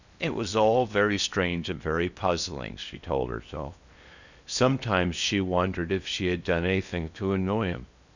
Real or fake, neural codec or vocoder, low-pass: fake; codec, 16 kHz in and 24 kHz out, 0.8 kbps, FocalCodec, streaming, 65536 codes; 7.2 kHz